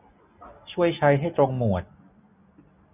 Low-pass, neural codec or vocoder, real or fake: 3.6 kHz; none; real